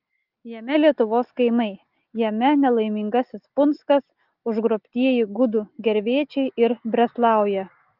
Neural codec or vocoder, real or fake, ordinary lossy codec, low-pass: none; real; Opus, 24 kbps; 5.4 kHz